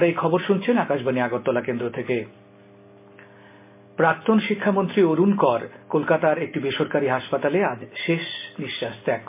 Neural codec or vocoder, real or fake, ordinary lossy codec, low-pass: none; real; MP3, 24 kbps; 3.6 kHz